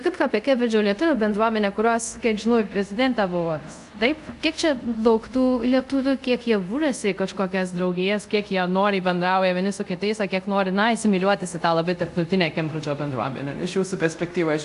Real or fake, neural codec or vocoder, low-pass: fake; codec, 24 kHz, 0.5 kbps, DualCodec; 10.8 kHz